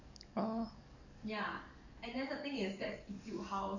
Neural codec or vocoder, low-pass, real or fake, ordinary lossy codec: codec, 44.1 kHz, 7.8 kbps, DAC; 7.2 kHz; fake; none